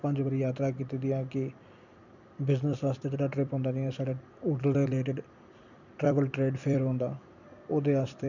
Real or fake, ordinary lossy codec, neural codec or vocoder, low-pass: fake; none; vocoder, 44.1 kHz, 128 mel bands every 256 samples, BigVGAN v2; 7.2 kHz